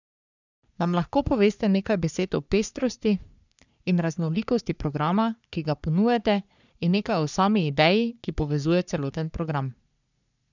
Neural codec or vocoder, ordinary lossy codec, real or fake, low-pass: codec, 44.1 kHz, 3.4 kbps, Pupu-Codec; none; fake; 7.2 kHz